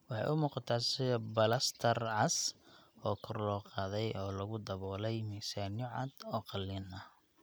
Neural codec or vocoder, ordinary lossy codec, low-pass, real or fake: none; none; none; real